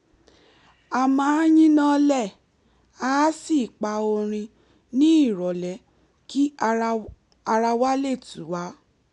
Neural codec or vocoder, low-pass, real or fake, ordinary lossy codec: none; 10.8 kHz; real; none